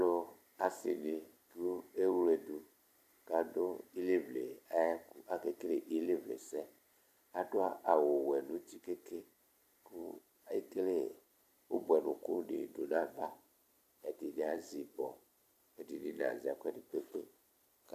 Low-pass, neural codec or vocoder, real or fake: 14.4 kHz; none; real